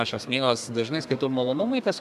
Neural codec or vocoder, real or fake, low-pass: codec, 32 kHz, 1.9 kbps, SNAC; fake; 14.4 kHz